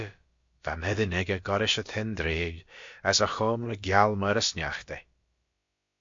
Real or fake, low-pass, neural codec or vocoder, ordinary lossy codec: fake; 7.2 kHz; codec, 16 kHz, about 1 kbps, DyCAST, with the encoder's durations; MP3, 48 kbps